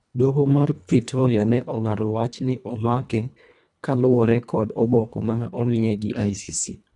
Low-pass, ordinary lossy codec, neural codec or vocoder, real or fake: 10.8 kHz; none; codec, 24 kHz, 1.5 kbps, HILCodec; fake